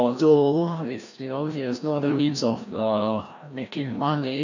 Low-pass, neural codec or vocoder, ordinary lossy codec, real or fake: 7.2 kHz; codec, 16 kHz, 1 kbps, FreqCodec, larger model; none; fake